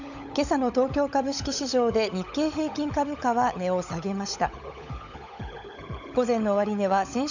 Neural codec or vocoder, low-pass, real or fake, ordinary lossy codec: codec, 16 kHz, 16 kbps, FunCodec, trained on LibriTTS, 50 frames a second; 7.2 kHz; fake; none